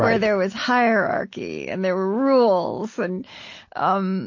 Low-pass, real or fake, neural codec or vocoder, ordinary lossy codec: 7.2 kHz; real; none; MP3, 32 kbps